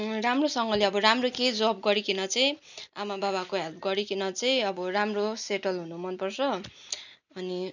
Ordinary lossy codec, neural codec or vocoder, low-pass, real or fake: none; none; 7.2 kHz; real